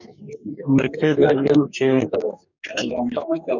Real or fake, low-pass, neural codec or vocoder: fake; 7.2 kHz; codec, 44.1 kHz, 2.6 kbps, DAC